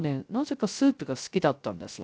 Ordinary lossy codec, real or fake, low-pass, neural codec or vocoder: none; fake; none; codec, 16 kHz, 0.3 kbps, FocalCodec